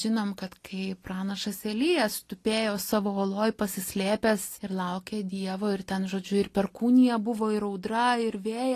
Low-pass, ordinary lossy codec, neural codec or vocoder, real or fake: 14.4 kHz; AAC, 48 kbps; none; real